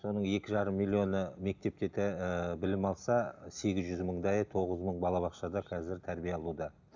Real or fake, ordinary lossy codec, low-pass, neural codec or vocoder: real; none; 7.2 kHz; none